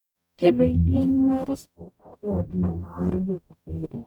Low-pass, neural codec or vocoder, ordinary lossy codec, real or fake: 19.8 kHz; codec, 44.1 kHz, 0.9 kbps, DAC; none; fake